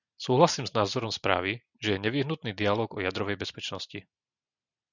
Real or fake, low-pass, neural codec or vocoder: real; 7.2 kHz; none